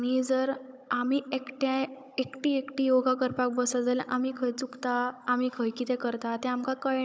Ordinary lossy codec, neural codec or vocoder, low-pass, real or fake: none; codec, 16 kHz, 16 kbps, FunCodec, trained on Chinese and English, 50 frames a second; none; fake